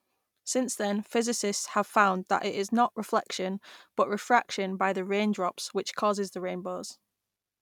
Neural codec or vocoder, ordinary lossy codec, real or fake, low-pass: none; none; real; 19.8 kHz